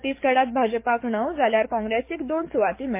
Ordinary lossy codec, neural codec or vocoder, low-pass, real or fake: MP3, 24 kbps; codec, 44.1 kHz, 7.8 kbps, DAC; 3.6 kHz; fake